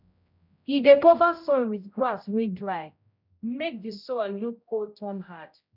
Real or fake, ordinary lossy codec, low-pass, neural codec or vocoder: fake; none; 5.4 kHz; codec, 16 kHz, 0.5 kbps, X-Codec, HuBERT features, trained on general audio